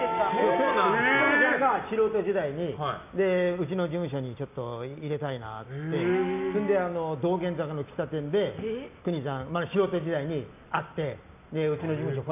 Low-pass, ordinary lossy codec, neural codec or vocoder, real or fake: 3.6 kHz; none; none; real